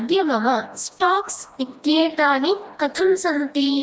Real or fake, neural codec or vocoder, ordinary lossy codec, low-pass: fake; codec, 16 kHz, 1 kbps, FreqCodec, smaller model; none; none